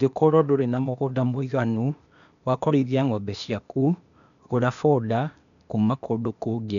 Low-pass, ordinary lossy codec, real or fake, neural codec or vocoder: 7.2 kHz; none; fake; codec, 16 kHz, 0.8 kbps, ZipCodec